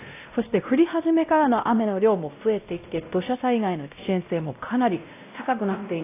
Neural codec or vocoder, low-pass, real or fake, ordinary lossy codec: codec, 16 kHz, 0.5 kbps, X-Codec, WavLM features, trained on Multilingual LibriSpeech; 3.6 kHz; fake; AAC, 24 kbps